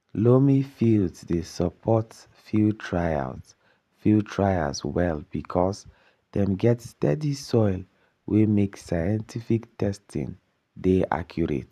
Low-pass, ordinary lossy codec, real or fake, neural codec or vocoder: 14.4 kHz; none; fake; vocoder, 44.1 kHz, 128 mel bands every 512 samples, BigVGAN v2